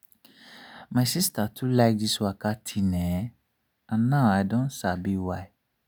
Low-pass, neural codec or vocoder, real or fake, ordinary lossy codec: none; none; real; none